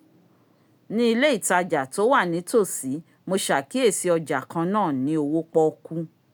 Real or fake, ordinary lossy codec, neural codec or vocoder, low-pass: real; none; none; none